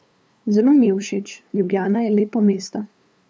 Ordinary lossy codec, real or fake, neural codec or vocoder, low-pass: none; fake; codec, 16 kHz, 2 kbps, FunCodec, trained on LibriTTS, 25 frames a second; none